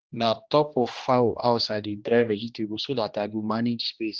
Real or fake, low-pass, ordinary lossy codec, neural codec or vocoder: fake; 7.2 kHz; Opus, 24 kbps; codec, 16 kHz, 1 kbps, X-Codec, HuBERT features, trained on general audio